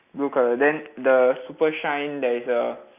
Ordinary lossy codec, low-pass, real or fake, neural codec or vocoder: none; 3.6 kHz; real; none